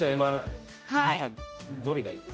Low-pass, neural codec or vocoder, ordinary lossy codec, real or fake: none; codec, 16 kHz, 0.5 kbps, X-Codec, HuBERT features, trained on general audio; none; fake